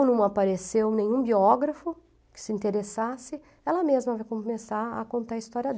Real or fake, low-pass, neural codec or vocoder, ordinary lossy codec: real; none; none; none